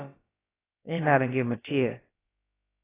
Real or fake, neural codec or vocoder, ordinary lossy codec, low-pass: fake; codec, 16 kHz, about 1 kbps, DyCAST, with the encoder's durations; AAC, 16 kbps; 3.6 kHz